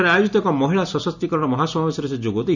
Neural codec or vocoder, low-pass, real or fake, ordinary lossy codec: none; 7.2 kHz; real; none